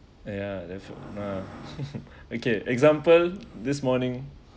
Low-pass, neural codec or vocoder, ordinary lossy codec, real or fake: none; none; none; real